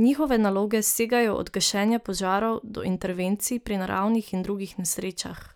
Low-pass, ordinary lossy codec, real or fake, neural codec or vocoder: none; none; real; none